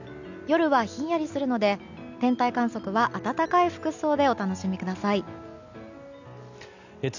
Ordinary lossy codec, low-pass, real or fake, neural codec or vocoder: none; 7.2 kHz; real; none